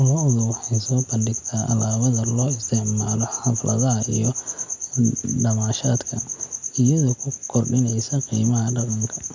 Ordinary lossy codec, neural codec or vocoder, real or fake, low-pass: MP3, 64 kbps; none; real; 7.2 kHz